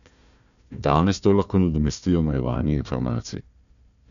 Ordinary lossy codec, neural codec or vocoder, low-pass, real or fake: none; codec, 16 kHz, 1 kbps, FunCodec, trained on Chinese and English, 50 frames a second; 7.2 kHz; fake